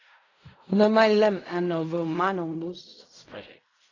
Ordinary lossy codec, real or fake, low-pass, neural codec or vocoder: AAC, 32 kbps; fake; 7.2 kHz; codec, 16 kHz in and 24 kHz out, 0.4 kbps, LongCat-Audio-Codec, fine tuned four codebook decoder